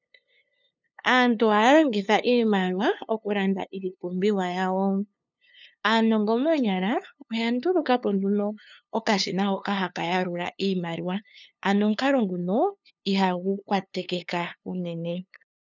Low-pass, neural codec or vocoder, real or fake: 7.2 kHz; codec, 16 kHz, 2 kbps, FunCodec, trained on LibriTTS, 25 frames a second; fake